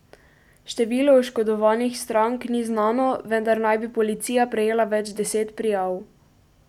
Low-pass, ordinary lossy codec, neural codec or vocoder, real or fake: 19.8 kHz; none; none; real